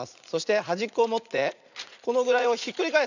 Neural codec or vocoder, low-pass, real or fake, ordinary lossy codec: vocoder, 44.1 kHz, 80 mel bands, Vocos; 7.2 kHz; fake; none